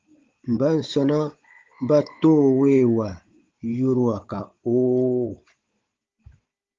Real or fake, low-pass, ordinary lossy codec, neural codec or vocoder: fake; 7.2 kHz; Opus, 32 kbps; codec, 16 kHz, 16 kbps, FunCodec, trained on Chinese and English, 50 frames a second